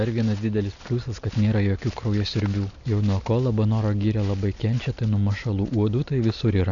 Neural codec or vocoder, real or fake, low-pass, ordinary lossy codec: none; real; 7.2 kHz; MP3, 96 kbps